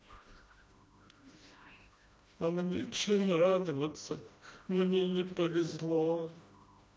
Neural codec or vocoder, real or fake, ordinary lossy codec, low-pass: codec, 16 kHz, 1 kbps, FreqCodec, smaller model; fake; none; none